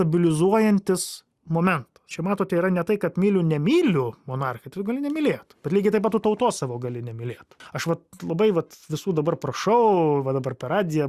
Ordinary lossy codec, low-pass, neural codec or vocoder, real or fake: Opus, 64 kbps; 14.4 kHz; none; real